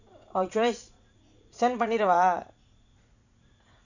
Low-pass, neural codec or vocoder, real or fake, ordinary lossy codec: 7.2 kHz; vocoder, 44.1 kHz, 80 mel bands, Vocos; fake; none